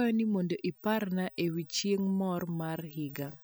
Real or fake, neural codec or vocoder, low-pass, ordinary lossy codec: real; none; none; none